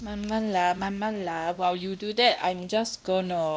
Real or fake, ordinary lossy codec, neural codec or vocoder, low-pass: fake; none; codec, 16 kHz, 1 kbps, X-Codec, WavLM features, trained on Multilingual LibriSpeech; none